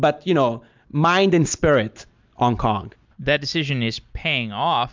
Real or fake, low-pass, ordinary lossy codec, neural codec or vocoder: real; 7.2 kHz; MP3, 64 kbps; none